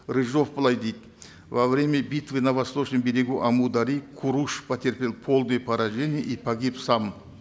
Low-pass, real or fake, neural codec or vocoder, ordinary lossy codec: none; real; none; none